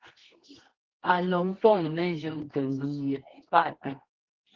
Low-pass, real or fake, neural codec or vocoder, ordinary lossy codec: 7.2 kHz; fake; codec, 24 kHz, 0.9 kbps, WavTokenizer, medium music audio release; Opus, 16 kbps